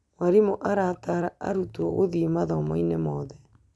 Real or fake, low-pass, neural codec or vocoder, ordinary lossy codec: real; none; none; none